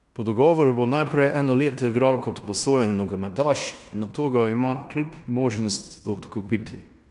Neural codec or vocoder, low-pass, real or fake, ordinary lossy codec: codec, 16 kHz in and 24 kHz out, 0.9 kbps, LongCat-Audio-Codec, fine tuned four codebook decoder; 10.8 kHz; fake; none